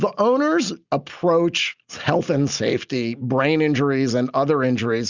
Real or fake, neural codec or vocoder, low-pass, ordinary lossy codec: real; none; 7.2 kHz; Opus, 64 kbps